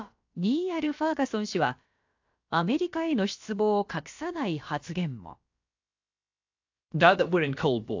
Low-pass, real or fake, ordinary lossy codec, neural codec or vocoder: 7.2 kHz; fake; AAC, 48 kbps; codec, 16 kHz, about 1 kbps, DyCAST, with the encoder's durations